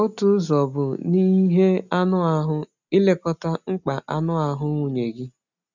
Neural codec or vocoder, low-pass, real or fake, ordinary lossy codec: none; 7.2 kHz; real; none